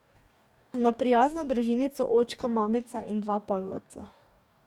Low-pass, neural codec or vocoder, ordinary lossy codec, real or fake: 19.8 kHz; codec, 44.1 kHz, 2.6 kbps, DAC; none; fake